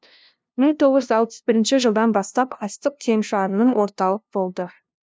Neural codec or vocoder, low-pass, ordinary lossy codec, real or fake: codec, 16 kHz, 0.5 kbps, FunCodec, trained on LibriTTS, 25 frames a second; none; none; fake